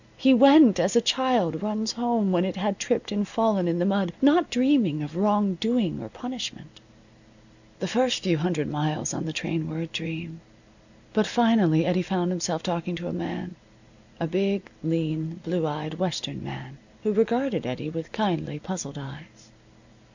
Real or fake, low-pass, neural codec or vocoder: real; 7.2 kHz; none